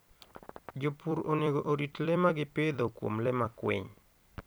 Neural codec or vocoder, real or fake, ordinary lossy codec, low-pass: vocoder, 44.1 kHz, 128 mel bands every 256 samples, BigVGAN v2; fake; none; none